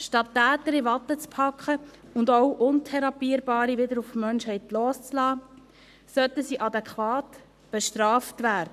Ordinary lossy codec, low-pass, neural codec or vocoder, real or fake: none; 14.4 kHz; codec, 44.1 kHz, 7.8 kbps, Pupu-Codec; fake